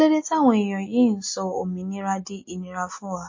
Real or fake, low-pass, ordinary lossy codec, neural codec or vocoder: real; 7.2 kHz; MP3, 32 kbps; none